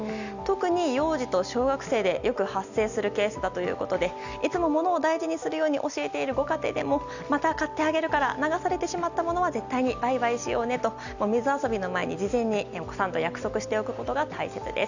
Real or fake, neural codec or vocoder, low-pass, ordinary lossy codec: real; none; 7.2 kHz; none